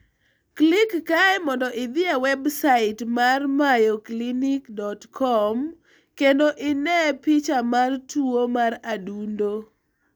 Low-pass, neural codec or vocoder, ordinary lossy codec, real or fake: none; none; none; real